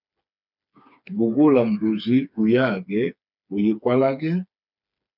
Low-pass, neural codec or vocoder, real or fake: 5.4 kHz; codec, 16 kHz, 4 kbps, FreqCodec, smaller model; fake